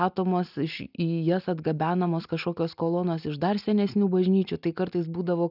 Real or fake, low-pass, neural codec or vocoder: real; 5.4 kHz; none